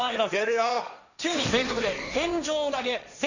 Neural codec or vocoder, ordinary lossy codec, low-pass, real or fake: codec, 16 kHz, 1.1 kbps, Voila-Tokenizer; none; 7.2 kHz; fake